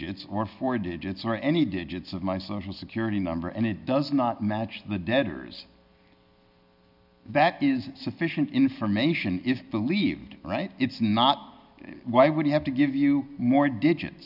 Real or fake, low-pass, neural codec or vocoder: real; 5.4 kHz; none